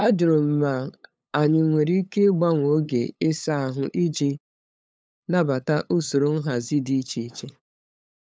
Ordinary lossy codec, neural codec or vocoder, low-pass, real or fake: none; codec, 16 kHz, 8 kbps, FunCodec, trained on LibriTTS, 25 frames a second; none; fake